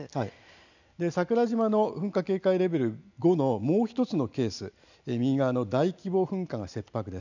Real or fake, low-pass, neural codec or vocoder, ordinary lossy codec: real; 7.2 kHz; none; none